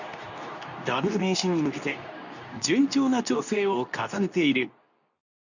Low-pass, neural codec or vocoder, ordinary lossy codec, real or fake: 7.2 kHz; codec, 24 kHz, 0.9 kbps, WavTokenizer, medium speech release version 2; none; fake